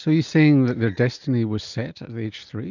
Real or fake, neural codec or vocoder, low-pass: real; none; 7.2 kHz